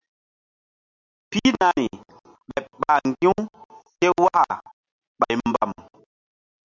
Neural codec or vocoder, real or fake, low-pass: none; real; 7.2 kHz